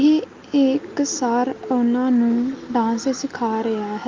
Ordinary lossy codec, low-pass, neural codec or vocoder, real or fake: Opus, 24 kbps; 7.2 kHz; none; real